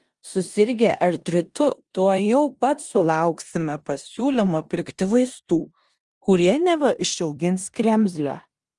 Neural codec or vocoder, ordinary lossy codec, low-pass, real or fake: codec, 16 kHz in and 24 kHz out, 0.9 kbps, LongCat-Audio-Codec, four codebook decoder; Opus, 32 kbps; 10.8 kHz; fake